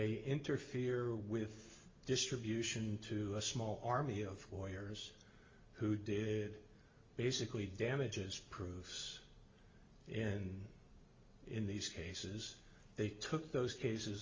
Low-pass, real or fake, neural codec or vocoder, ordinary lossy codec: 7.2 kHz; real; none; Opus, 32 kbps